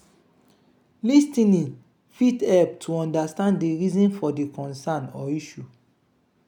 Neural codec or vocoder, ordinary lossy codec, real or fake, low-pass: none; none; real; 19.8 kHz